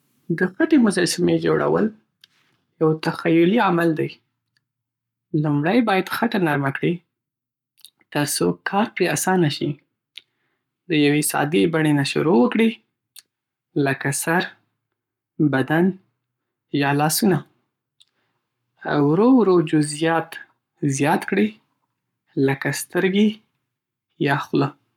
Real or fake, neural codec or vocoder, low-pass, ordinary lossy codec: fake; codec, 44.1 kHz, 7.8 kbps, Pupu-Codec; 19.8 kHz; none